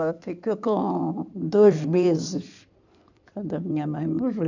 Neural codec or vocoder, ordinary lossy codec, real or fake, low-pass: codec, 16 kHz in and 24 kHz out, 2.2 kbps, FireRedTTS-2 codec; none; fake; 7.2 kHz